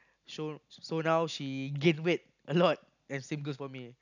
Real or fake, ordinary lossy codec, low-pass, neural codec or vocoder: real; none; 7.2 kHz; none